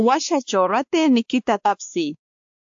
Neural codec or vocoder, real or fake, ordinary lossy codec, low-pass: codec, 16 kHz, 2 kbps, X-Codec, WavLM features, trained on Multilingual LibriSpeech; fake; AAC, 64 kbps; 7.2 kHz